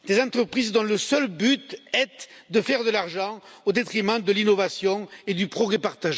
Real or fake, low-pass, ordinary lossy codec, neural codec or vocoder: real; none; none; none